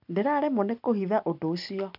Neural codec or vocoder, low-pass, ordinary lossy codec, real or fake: none; 5.4 kHz; none; real